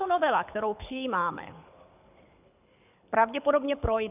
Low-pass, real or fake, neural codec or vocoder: 3.6 kHz; fake; codec, 24 kHz, 6 kbps, HILCodec